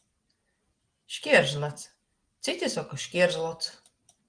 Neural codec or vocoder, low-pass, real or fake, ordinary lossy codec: none; 9.9 kHz; real; Opus, 24 kbps